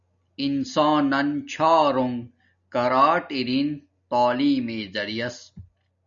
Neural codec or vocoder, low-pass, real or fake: none; 7.2 kHz; real